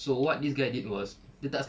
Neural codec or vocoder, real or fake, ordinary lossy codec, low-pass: none; real; none; none